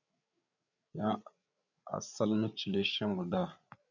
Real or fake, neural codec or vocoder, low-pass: fake; codec, 16 kHz, 8 kbps, FreqCodec, larger model; 7.2 kHz